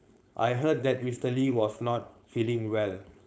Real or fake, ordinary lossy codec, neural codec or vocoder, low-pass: fake; none; codec, 16 kHz, 4.8 kbps, FACodec; none